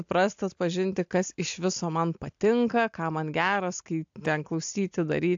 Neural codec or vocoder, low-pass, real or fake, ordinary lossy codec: none; 7.2 kHz; real; AAC, 64 kbps